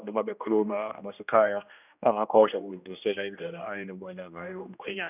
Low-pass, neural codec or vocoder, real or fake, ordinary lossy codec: 3.6 kHz; codec, 16 kHz, 1 kbps, X-Codec, HuBERT features, trained on general audio; fake; none